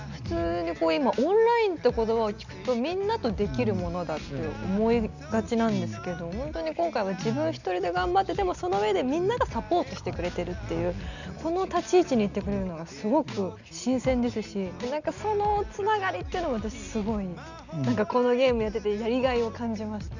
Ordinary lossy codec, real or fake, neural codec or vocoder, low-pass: none; real; none; 7.2 kHz